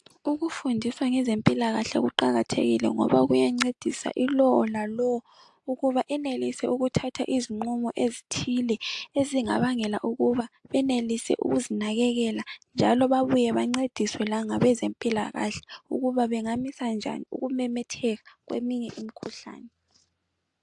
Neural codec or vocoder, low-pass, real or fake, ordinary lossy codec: none; 10.8 kHz; real; AAC, 64 kbps